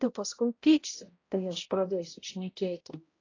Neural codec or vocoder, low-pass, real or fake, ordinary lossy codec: codec, 16 kHz, 0.5 kbps, X-Codec, HuBERT features, trained on balanced general audio; 7.2 kHz; fake; AAC, 32 kbps